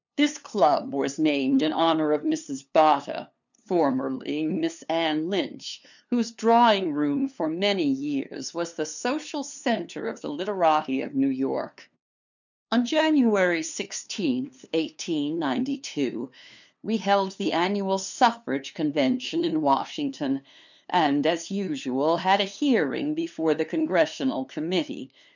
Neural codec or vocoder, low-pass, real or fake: codec, 16 kHz, 2 kbps, FunCodec, trained on LibriTTS, 25 frames a second; 7.2 kHz; fake